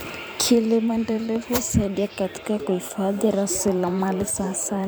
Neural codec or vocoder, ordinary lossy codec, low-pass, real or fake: vocoder, 44.1 kHz, 128 mel bands every 512 samples, BigVGAN v2; none; none; fake